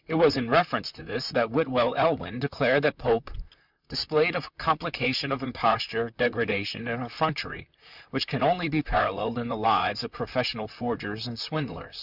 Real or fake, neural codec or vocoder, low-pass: fake; vocoder, 44.1 kHz, 128 mel bands, Pupu-Vocoder; 5.4 kHz